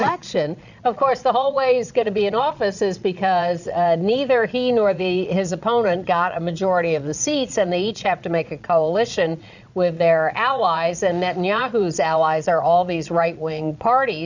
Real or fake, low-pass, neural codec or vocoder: fake; 7.2 kHz; vocoder, 22.05 kHz, 80 mel bands, Vocos